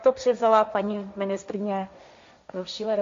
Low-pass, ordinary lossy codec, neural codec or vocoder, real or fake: 7.2 kHz; MP3, 48 kbps; codec, 16 kHz, 1.1 kbps, Voila-Tokenizer; fake